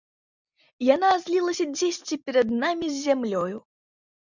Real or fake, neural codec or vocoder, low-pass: real; none; 7.2 kHz